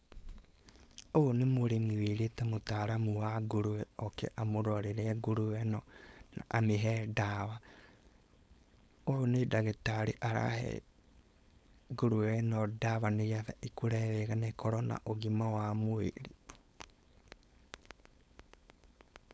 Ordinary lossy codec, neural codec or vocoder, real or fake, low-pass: none; codec, 16 kHz, 4.8 kbps, FACodec; fake; none